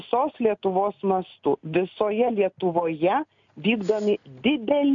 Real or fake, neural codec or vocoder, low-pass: real; none; 7.2 kHz